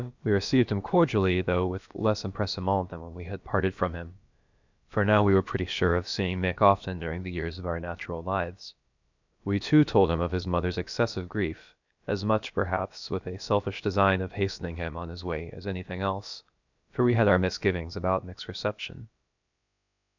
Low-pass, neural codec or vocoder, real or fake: 7.2 kHz; codec, 16 kHz, about 1 kbps, DyCAST, with the encoder's durations; fake